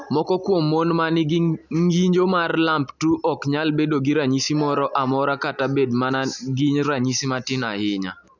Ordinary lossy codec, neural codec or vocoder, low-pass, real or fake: none; none; 7.2 kHz; real